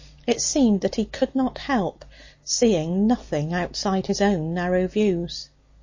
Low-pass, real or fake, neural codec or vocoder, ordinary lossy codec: 7.2 kHz; real; none; MP3, 32 kbps